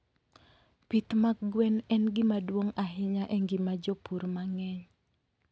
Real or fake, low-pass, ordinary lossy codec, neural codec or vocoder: real; none; none; none